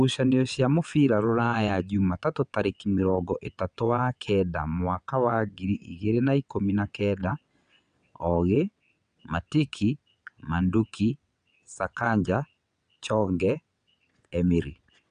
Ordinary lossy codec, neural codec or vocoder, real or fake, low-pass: none; vocoder, 22.05 kHz, 80 mel bands, WaveNeXt; fake; 9.9 kHz